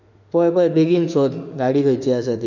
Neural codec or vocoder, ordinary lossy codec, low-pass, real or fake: autoencoder, 48 kHz, 32 numbers a frame, DAC-VAE, trained on Japanese speech; none; 7.2 kHz; fake